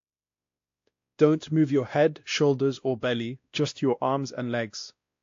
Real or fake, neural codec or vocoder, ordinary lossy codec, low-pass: fake; codec, 16 kHz, 1 kbps, X-Codec, WavLM features, trained on Multilingual LibriSpeech; AAC, 48 kbps; 7.2 kHz